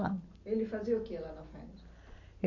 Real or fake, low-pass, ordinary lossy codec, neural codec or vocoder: real; 7.2 kHz; none; none